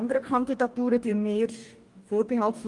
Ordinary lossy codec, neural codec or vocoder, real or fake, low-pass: Opus, 32 kbps; codec, 44.1 kHz, 2.6 kbps, DAC; fake; 10.8 kHz